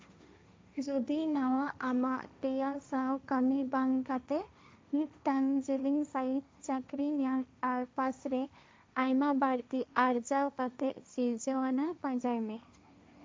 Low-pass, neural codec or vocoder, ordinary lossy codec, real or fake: none; codec, 16 kHz, 1.1 kbps, Voila-Tokenizer; none; fake